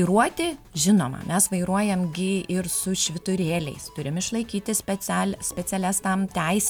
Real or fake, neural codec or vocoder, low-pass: real; none; 19.8 kHz